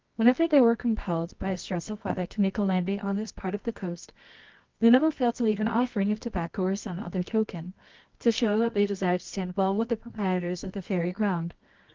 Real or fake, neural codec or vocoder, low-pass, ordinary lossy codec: fake; codec, 24 kHz, 0.9 kbps, WavTokenizer, medium music audio release; 7.2 kHz; Opus, 24 kbps